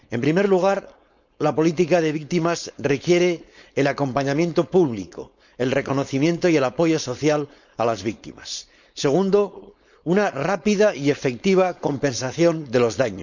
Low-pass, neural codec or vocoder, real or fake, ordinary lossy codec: 7.2 kHz; codec, 16 kHz, 4.8 kbps, FACodec; fake; none